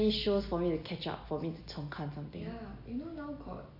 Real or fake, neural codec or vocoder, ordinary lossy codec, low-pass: real; none; none; 5.4 kHz